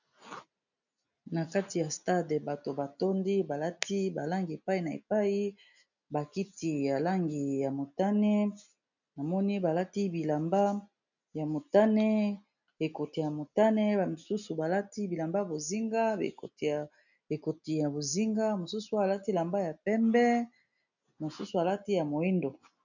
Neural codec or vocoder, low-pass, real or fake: none; 7.2 kHz; real